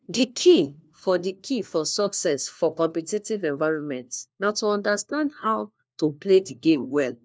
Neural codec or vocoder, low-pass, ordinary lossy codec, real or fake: codec, 16 kHz, 1 kbps, FunCodec, trained on LibriTTS, 50 frames a second; none; none; fake